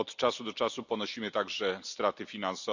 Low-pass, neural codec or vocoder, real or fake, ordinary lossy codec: 7.2 kHz; none; real; none